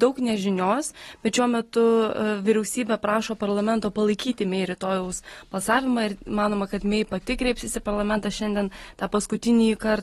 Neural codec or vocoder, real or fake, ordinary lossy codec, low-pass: none; real; AAC, 32 kbps; 19.8 kHz